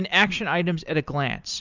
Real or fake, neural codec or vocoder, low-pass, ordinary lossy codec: real; none; 7.2 kHz; Opus, 64 kbps